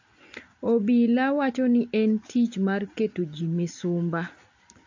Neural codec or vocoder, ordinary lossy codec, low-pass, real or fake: none; AAC, 48 kbps; 7.2 kHz; real